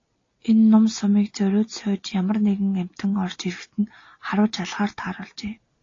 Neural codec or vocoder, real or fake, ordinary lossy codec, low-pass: none; real; AAC, 32 kbps; 7.2 kHz